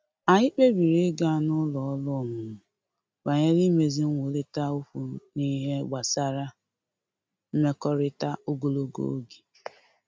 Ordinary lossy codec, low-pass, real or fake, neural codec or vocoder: none; none; real; none